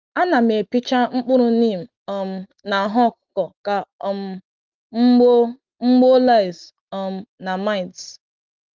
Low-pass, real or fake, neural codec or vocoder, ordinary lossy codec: 7.2 kHz; real; none; Opus, 32 kbps